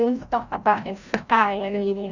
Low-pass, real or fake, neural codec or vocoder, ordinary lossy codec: 7.2 kHz; fake; codec, 16 kHz, 0.5 kbps, FreqCodec, larger model; none